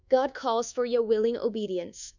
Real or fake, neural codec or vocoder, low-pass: fake; codec, 24 kHz, 1.2 kbps, DualCodec; 7.2 kHz